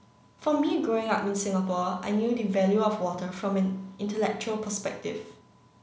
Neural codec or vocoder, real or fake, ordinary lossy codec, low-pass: none; real; none; none